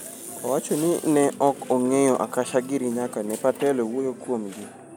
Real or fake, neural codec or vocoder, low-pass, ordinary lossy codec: real; none; none; none